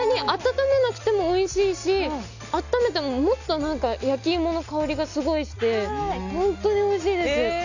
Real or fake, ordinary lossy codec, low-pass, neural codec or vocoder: real; none; 7.2 kHz; none